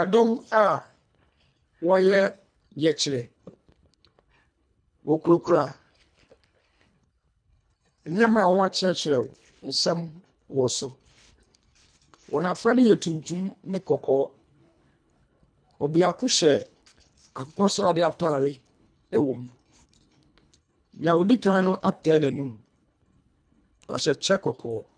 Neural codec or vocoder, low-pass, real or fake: codec, 24 kHz, 1.5 kbps, HILCodec; 9.9 kHz; fake